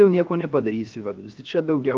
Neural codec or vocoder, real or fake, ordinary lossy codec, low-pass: codec, 16 kHz, 0.7 kbps, FocalCodec; fake; Opus, 32 kbps; 7.2 kHz